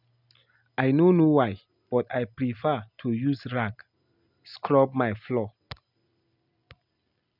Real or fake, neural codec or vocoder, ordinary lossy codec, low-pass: real; none; none; 5.4 kHz